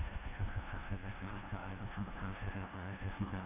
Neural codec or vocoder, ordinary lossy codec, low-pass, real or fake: codec, 16 kHz, 0.5 kbps, FunCodec, trained on LibriTTS, 25 frames a second; none; 3.6 kHz; fake